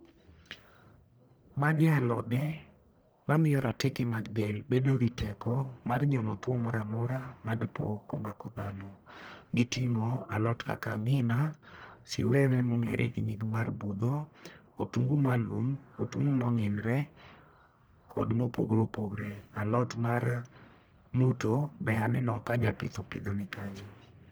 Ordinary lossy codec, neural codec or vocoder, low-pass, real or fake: none; codec, 44.1 kHz, 1.7 kbps, Pupu-Codec; none; fake